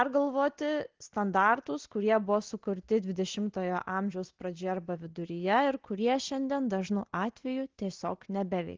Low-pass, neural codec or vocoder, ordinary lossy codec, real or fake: 7.2 kHz; none; Opus, 16 kbps; real